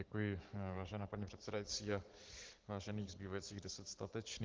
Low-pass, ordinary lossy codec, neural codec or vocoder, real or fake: 7.2 kHz; Opus, 16 kbps; vocoder, 44.1 kHz, 128 mel bands every 512 samples, BigVGAN v2; fake